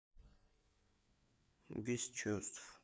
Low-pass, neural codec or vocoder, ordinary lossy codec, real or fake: none; codec, 16 kHz, 4 kbps, FreqCodec, larger model; none; fake